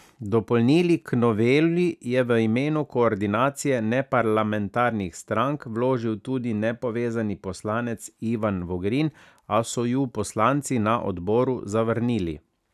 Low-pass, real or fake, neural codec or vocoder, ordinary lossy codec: 14.4 kHz; real; none; none